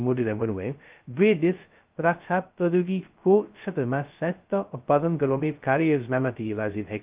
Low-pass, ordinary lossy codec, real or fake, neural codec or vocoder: 3.6 kHz; Opus, 24 kbps; fake; codec, 16 kHz, 0.2 kbps, FocalCodec